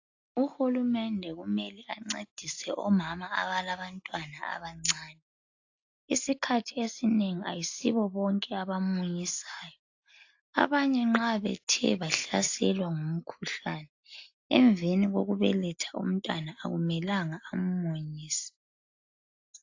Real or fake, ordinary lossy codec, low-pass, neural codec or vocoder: real; AAC, 48 kbps; 7.2 kHz; none